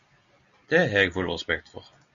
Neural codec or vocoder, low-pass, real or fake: none; 7.2 kHz; real